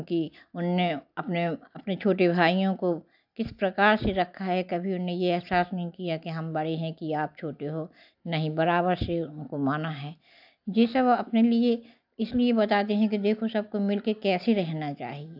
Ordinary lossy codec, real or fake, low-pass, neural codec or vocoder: AAC, 48 kbps; real; 5.4 kHz; none